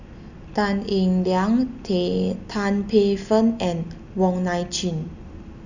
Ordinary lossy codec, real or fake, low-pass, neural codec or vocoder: none; real; 7.2 kHz; none